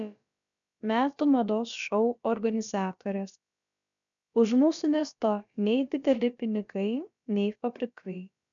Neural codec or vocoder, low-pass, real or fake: codec, 16 kHz, about 1 kbps, DyCAST, with the encoder's durations; 7.2 kHz; fake